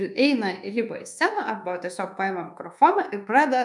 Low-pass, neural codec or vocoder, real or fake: 10.8 kHz; codec, 24 kHz, 1.2 kbps, DualCodec; fake